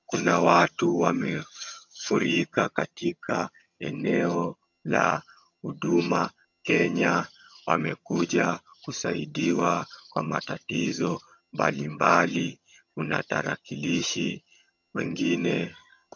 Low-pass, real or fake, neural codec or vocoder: 7.2 kHz; fake; vocoder, 22.05 kHz, 80 mel bands, HiFi-GAN